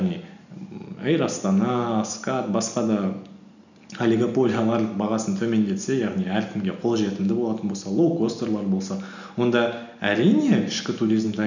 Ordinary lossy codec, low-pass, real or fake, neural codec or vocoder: none; 7.2 kHz; real; none